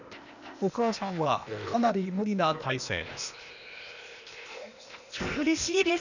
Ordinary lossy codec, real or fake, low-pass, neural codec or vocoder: none; fake; 7.2 kHz; codec, 16 kHz, 0.8 kbps, ZipCodec